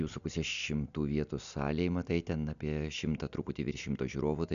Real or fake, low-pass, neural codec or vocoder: real; 7.2 kHz; none